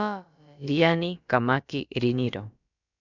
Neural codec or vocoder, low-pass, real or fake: codec, 16 kHz, about 1 kbps, DyCAST, with the encoder's durations; 7.2 kHz; fake